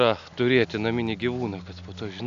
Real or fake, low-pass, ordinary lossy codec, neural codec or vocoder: real; 7.2 kHz; AAC, 96 kbps; none